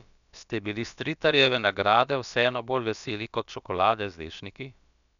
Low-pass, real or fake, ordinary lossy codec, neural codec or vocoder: 7.2 kHz; fake; none; codec, 16 kHz, about 1 kbps, DyCAST, with the encoder's durations